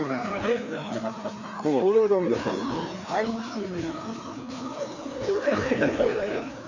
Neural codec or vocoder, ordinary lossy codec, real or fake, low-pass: codec, 16 kHz, 2 kbps, FreqCodec, larger model; none; fake; 7.2 kHz